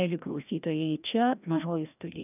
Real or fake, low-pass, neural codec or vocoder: fake; 3.6 kHz; codec, 16 kHz, 1 kbps, FreqCodec, larger model